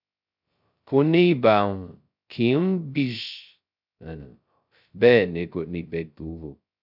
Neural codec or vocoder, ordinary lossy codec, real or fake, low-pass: codec, 16 kHz, 0.2 kbps, FocalCodec; none; fake; 5.4 kHz